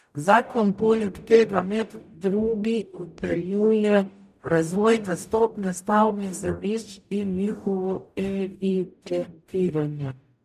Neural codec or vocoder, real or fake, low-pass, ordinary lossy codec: codec, 44.1 kHz, 0.9 kbps, DAC; fake; 14.4 kHz; none